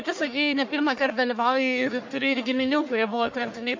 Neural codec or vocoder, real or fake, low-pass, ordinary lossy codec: codec, 44.1 kHz, 1.7 kbps, Pupu-Codec; fake; 7.2 kHz; MP3, 48 kbps